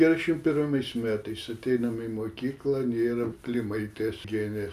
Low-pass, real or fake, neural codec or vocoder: 14.4 kHz; real; none